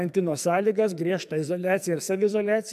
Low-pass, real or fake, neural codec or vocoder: 14.4 kHz; fake; codec, 44.1 kHz, 2.6 kbps, SNAC